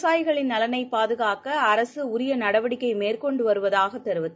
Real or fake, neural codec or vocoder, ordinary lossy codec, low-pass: real; none; none; none